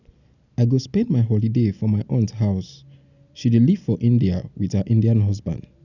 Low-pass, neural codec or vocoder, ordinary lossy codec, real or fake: 7.2 kHz; none; none; real